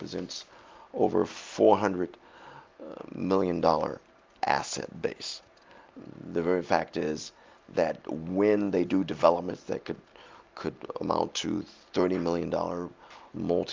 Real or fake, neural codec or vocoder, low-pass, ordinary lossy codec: real; none; 7.2 kHz; Opus, 24 kbps